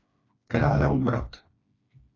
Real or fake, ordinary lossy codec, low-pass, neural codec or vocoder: fake; AAC, 32 kbps; 7.2 kHz; codec, 16 kHz, 2 kbps, FreqCodec, smaller model